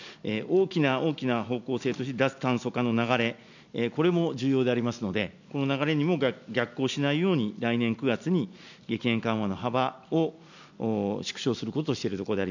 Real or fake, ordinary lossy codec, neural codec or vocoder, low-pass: real; none; none; 7.2 kHz